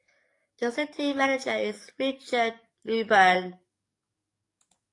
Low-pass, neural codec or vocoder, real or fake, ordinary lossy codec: 10.8 kHz; codec, 44.1 kHz, 7.8 kbps, DAC; fake; AAC, 48 kbps